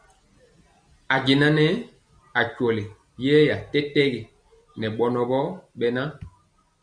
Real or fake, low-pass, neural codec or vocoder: real; 9.9 kHz; none